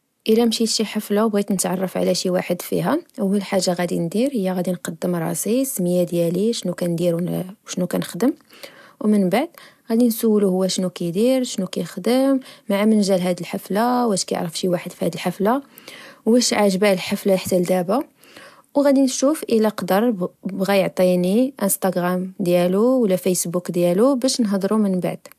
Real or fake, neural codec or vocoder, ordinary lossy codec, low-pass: real; none; AAC, 96 kbps; 14.4 kHz